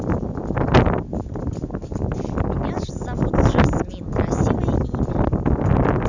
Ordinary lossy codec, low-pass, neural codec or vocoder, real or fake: none; 7.2 kHz; none; real